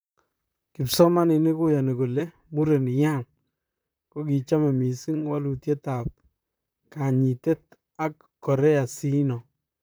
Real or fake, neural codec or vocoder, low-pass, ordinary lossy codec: fake; vocoder, 44.1 kHz, 128 mel bands, Pupu-Vocoder; none; none